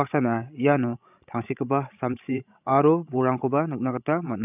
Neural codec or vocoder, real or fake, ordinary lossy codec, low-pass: codec, 16 kHz, 16 kbps, FreqCodec, larger model; fake; none; 3.6 kHz